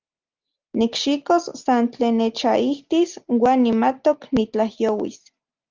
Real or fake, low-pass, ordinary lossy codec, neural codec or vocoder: real; 7.2 kHz; Opus, 24 kbps; none